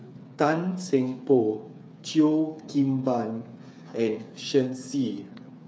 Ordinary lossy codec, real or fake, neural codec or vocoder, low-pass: none; fake; codec, 16 kHz, 8 kbps, FreqCodec, smaller model; none